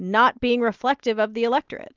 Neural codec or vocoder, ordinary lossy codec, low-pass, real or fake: none; Opus, 32 kbps; 7.2 kHz; real